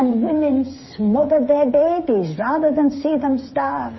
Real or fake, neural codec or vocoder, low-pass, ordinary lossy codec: fake; codec, 16 kHz, 8 kbps, FreqCodec, smaller model; 7.2 kHz; MP3, 24 kbps